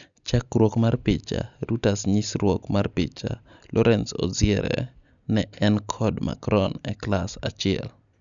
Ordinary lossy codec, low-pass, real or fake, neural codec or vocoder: none; 7.2 kHz; real; none